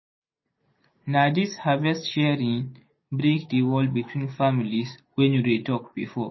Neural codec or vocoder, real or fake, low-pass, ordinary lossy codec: none; real; 7.2 kHz; MP3, 24 kbps